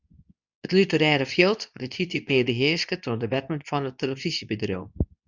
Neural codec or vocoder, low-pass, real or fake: codec, 24 kHz, 0.9 kbps, WavTokenizer, medium speech release version 2; 7.2 kHz; fake